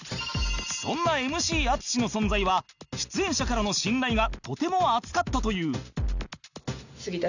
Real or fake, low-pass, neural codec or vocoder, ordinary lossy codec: real; 7.2 kHz; none; none